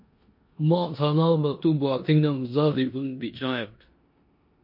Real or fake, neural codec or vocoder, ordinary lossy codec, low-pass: fake; codec, 16 kHz in and 24 kHz out, 0.9 kbps, LongCat-Audio-Codec, four codebook decoder; MP3, 32 kbps; 5.4 kHz